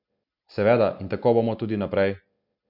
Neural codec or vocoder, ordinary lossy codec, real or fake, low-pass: none; none; real; 5.4 kHz